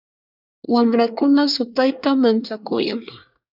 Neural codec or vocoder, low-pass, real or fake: codec, 24 kHz, 1 kbps, SNAC; 5.4 kHz; fake